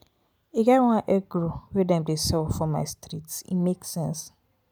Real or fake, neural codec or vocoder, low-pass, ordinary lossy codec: real; none; none; none